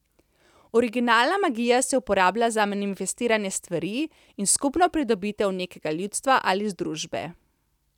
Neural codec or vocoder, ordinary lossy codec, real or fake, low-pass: none; none; real; 19.8 kHz